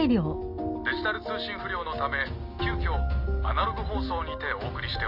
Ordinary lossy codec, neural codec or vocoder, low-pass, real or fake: none; none; 5.4 kHz; real